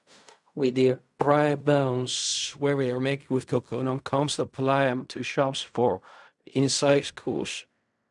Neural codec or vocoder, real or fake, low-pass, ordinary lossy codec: codec, 16 kHz in and 24 kHz out, 0.4 kbps, LongCat-Audio-Codec, fine tuned four codebook decoder; fake; 10.8 kHz; none